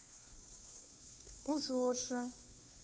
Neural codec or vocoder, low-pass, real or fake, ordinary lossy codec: codec, 16 kHz, 2 kbps, FunCodec, trained on Chinese and English, 25 frames a second; none; fake; none